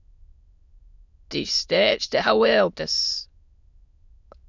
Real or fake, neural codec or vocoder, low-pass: fake; autoencoder, 22.05 kHz, a latent of 192 numbers a frame, VITS, trained on many speakers; 7.2 kHz